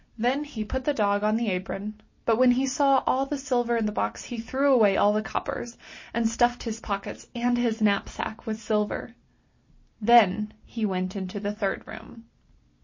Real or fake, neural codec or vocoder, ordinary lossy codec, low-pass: real; none; MP3, 32 kbps; 7.2 kHz